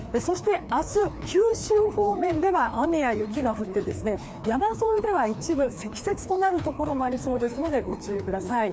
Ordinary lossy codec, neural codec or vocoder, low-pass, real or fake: none; codec, 16 kHz, 2 kbps, FreqCodec, larger model; none; fake